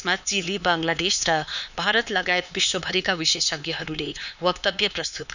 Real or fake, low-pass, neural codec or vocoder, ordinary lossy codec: fake; 7.2 kHz; codec, 16 kHz, 4 kbps, X-Codec, HuBERT features, trained on LibriSpeech; none